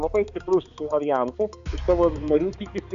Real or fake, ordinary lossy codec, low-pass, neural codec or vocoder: fake; AAC, 96 kbps; 7.2 kHz; codec, 16 kHz, 4 kbps, X-Codec, HuBERT features, trained on balanced general audio